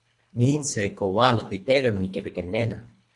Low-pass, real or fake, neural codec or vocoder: 10.8 kHz; fake; codec, 24 kHz, 1.5 kbps, HILCodec